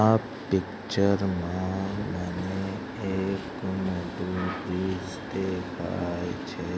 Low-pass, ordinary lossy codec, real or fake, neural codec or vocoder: none; none; real; none